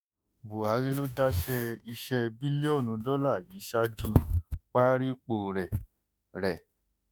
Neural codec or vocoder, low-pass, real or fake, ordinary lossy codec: autoencoder, 48 kHz, 32 numbers a frame, DAC-VAE, trained on Japanese speech; none; fake; none